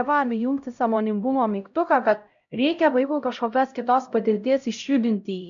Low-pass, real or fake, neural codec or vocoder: 7.2 kHz; fake; codec, 16 kHz, 0.5 kbps, X-Codec, HuBERT features, trained on LibriSpeech